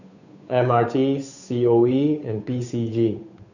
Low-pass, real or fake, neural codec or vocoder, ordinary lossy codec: 7.2 kHz; fake; codec, 16 kHz, 8 kbps, FunCodec, trained on Chinese and English, 25 frames a second; none